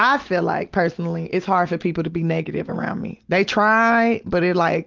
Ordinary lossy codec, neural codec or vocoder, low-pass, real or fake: Opus, 32 kbps; none; 7.2 kHz; real